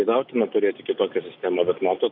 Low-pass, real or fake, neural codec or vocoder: 5.4 kHz; real; none